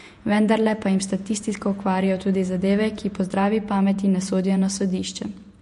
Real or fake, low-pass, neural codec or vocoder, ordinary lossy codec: fake; 14.4 kHz; vocoder, 48 kHz, 128 mel bands, Vocos; MP3, 48 kbps